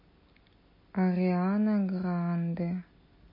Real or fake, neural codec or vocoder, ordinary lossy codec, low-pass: real; none; MP3, 24 kbps; 5.4 kHz